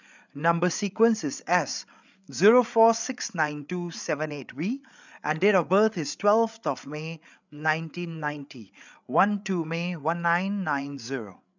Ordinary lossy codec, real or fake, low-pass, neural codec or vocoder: none; fake; 7.2 kHz; codec, 16 kHz, 8 kbps, FreqCodec, larger model